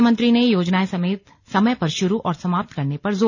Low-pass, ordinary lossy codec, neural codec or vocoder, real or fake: 7.2 kHz; none; none; real